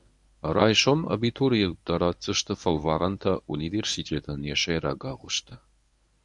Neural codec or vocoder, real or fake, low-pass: codec, 24 kHz, 0.9 kbps, WavTokenizer, medium speech release version 1; fake; 10.8 kHz